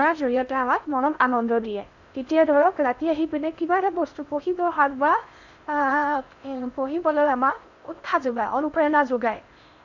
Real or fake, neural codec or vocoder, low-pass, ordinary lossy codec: fake; codec, 16 kHz in and 24 kHz out, 0.6 kbps, FocalCodec, streaming, 2048 codes; 7.2 kHz; none